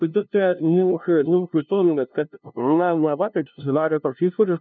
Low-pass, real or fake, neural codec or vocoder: 7.2 kHz; fake; codec, 16 kHz, 0.5 kbps, FunCodec, trained on LibriTTS, 25 frames a second